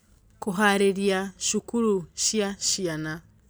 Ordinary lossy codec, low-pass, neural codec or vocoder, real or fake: none; none; none; real